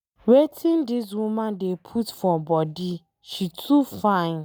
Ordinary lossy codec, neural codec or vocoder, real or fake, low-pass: none; none; real; none